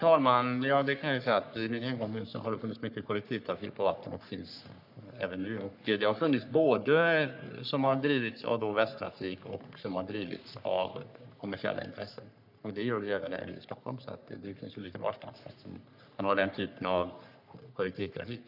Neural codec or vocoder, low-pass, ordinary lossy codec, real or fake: codec, 44.1 kHz, 3.4 kbps, Pupu-Codec; 5.4 kHz; none; fake